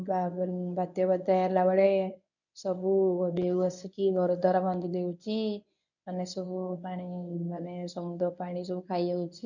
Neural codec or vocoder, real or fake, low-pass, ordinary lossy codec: codec, 24 kHz, 0.9 kbps, WavTokenizer, medium speech release version 1; fake; 7.2 kHz; none